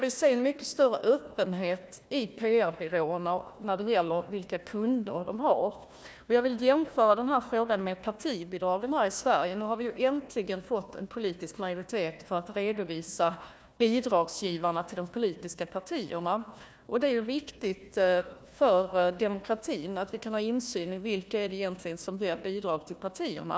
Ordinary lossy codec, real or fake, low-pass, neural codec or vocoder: none; fake; none; codec, 16 kHz, 1 kbps, FunCodec, trained on Chinese and English, 50 frames a second